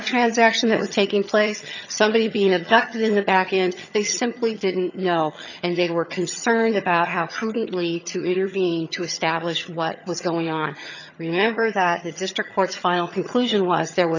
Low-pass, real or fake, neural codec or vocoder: 7.2 kHz; fake; vocoder, 22.05 kHz, 80 mel bands, HiFi-GAN